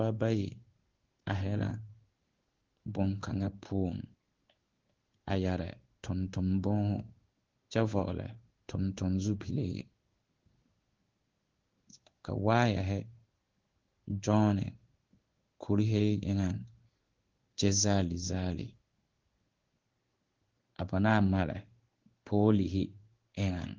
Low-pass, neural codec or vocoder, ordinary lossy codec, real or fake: 7.2 kHz; codec, 16 kHz in and 24 kHz out, 1 kbps, XY-Tokenizer; Opus, 16 kbps; fake